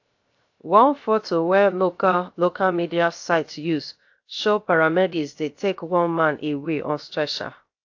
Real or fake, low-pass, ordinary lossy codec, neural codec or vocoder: fake; 7.2 kHz; AAC, 48 kbps; codec, 16 kHz, 0.7 kbps, FocalCodec